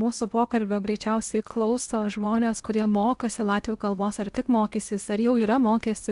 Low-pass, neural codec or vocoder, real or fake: 10.8 kHz; codec, 16 kHz in and 24 kHz out, 0.8 kbps, FocalCodec, streaming, 65536 codes; fake